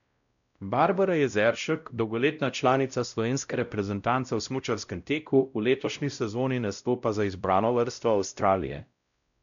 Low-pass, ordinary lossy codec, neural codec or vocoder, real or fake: 7.2 kHz; none; codec, 16 kHz, 0.5 kbps, X-Codec, WavLM features, trained on Multilingual LibriSpeech; fake